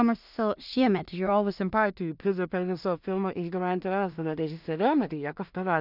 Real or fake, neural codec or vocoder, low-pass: fake; codec, 16 kHz in and 24 kHz out, 0.4 kbps, LongCat-Audio-Codec, two codebook decoder; 5.4 kHz